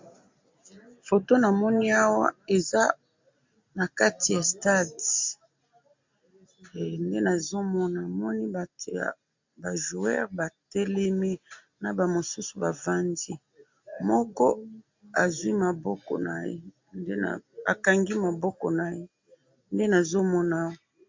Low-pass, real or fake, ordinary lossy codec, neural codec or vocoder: 7.2 kHz; real; MP3, 64 kbps; none